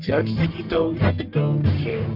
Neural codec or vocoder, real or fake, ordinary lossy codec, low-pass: codec, 44.1 kHz, 1.7 kbps, Pupu-Codec; fake; AAC, 48 kbps; 5.4 kHz